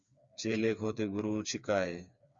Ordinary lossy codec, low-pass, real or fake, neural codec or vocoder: Opus, 64 kbps; 7.2 kHz; fake; codec, 16 kHz, 4 kbps, FreqCodec, smaller model